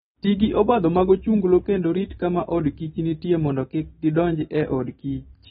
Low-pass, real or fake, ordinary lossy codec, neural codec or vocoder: 19.8 kHz; real; AAC, 16 kbps; none